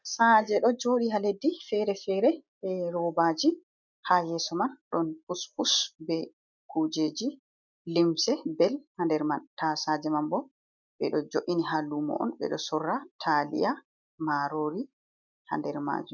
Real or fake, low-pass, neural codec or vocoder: real; 7.2 kHz; none